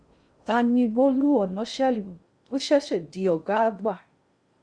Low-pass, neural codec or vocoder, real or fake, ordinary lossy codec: 9.9 kHz; codec, 16 kHz in and 24 kHz out, 0.6 kbps, FocalCodec, streaming, 2048 codes; fake; Opus, 64 kbps